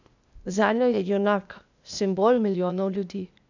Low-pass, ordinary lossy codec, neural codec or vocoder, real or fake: 7.2 kHz; none; codec, 16 kHz, 0.8 kbps, ZipCodec; fake